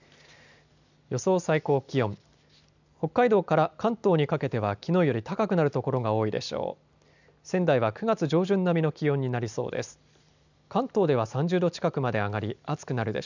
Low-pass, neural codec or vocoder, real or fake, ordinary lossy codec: 7.2 kHz; none; real; none